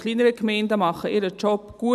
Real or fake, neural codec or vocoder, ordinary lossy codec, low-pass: real; none; none; 14.4 kHz